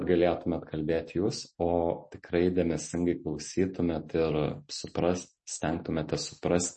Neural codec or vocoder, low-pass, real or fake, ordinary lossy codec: none; 9.9 kHz; real; MP3, 32 kbps